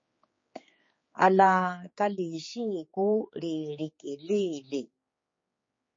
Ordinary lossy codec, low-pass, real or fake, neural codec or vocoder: MP3, 32 kbps; 7.2 kHz; fake; codec, 16 kHz, 4 kbps, X-Codec, HuBERT features, trained on general audio